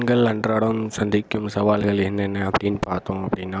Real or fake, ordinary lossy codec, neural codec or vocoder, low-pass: real; none; none; none